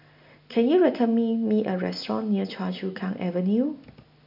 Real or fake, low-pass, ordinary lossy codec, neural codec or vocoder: real; 5.4 kHz; none; none